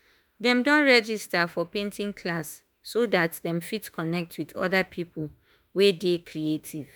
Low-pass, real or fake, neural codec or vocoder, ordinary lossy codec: none; fake; autoencoder, 48 kHz, 32 numbers a frame, DAC-VAE, trained on Japanese speech; none